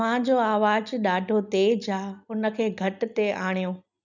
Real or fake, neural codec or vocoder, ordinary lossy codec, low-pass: real; none; none; 7.2 kHz